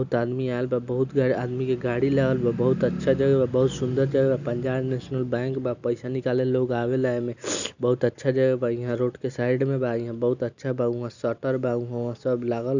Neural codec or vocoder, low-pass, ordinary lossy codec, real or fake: none; 7.2 kHz; none; real